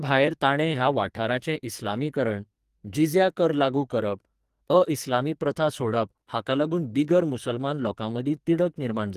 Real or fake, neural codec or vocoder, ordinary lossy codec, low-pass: fake; codec, 44.1 kHz, 2.6 kbps, SNAC; Opus, 24 kbps; 14.4 kHz